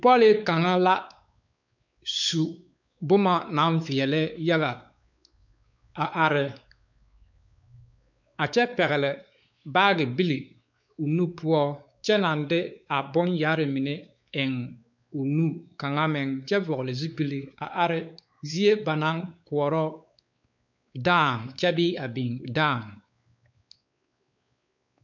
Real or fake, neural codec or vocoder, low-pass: fake; codec, 16 kHz, 4 kbps, X-Codec, WavLM features, trained on Multilingual LibriSpeech; 7.2 kHz